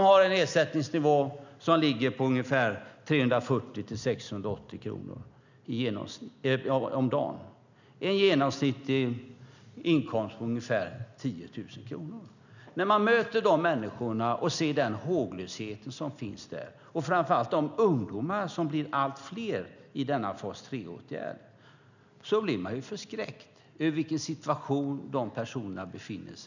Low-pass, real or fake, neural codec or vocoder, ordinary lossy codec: 7.2 kHz; real; none; none